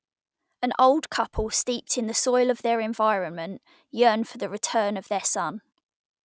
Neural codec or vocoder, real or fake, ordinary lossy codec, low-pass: none; real; none; none